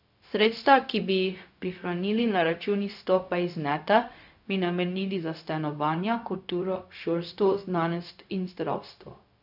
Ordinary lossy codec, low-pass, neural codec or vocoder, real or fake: none; 5.4 kHz; codec, 16 kHz, 0.4 kbps, LongCat-Audio-Codec; fake